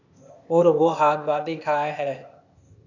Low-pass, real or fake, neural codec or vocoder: 7.2 kHz; fake; codec, 16 kHz, 0.8 kbps, ZipCodec